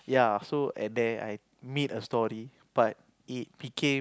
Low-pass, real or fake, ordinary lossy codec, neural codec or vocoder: none; real; none; none